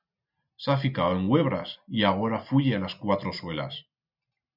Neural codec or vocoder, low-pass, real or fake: none; 5.4 kHz; real